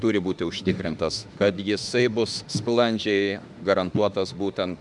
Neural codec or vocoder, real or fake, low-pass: autoencoder, 48 kHz, 32 numbers a frame, DAC-VAE, trained on Japanese speech; fake; 10.8 kHz